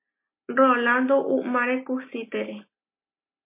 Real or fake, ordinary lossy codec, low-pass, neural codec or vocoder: real; MP3, 24 kbps; 3.6 kHz; none